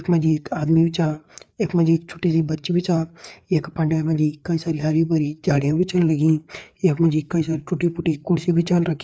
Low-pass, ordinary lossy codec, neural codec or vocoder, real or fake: none; none; codec, 16 kHz, 4 kbps, FreqCodec, larger model; fake